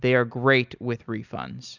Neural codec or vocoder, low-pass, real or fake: none; 7.2 kHz; real